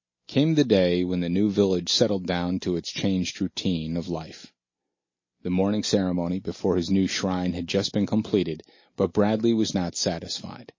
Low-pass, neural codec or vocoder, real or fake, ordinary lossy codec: 7.2 kHz; none; real; MP3, 32 kbps